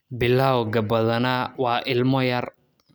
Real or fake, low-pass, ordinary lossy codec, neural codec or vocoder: real; none; none; none